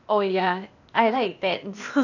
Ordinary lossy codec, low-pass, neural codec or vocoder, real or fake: none; 7.2 kHz; codec, 16 kHz, 0.8 kbps, ZipCodec; fake